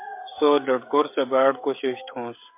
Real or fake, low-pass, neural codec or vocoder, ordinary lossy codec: fake; 3.6 kHz; codec, 16 kHz, 16 kbps, FreqCodec, smaller model; MP3, 24 kbps